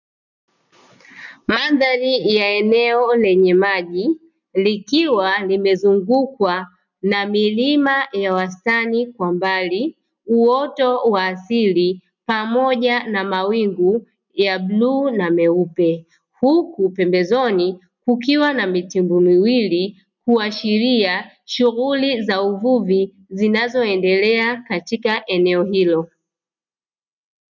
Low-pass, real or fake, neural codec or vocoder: 7.2 kHz; real; none